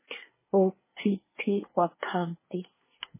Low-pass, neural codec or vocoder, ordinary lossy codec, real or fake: 3.6 kHz; codec, 24 kHz, 1 kbps, SNAC; MP3, 16 kbps; fake